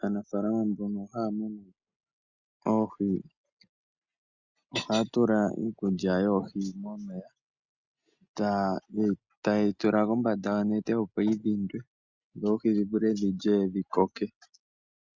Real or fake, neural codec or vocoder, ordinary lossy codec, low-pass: real; none; Opus, 64 kbps; 7.2 kHz